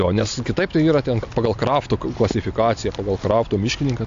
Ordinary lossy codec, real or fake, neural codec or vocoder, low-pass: AAC, 64 kbps; real; none; 7.2 kHz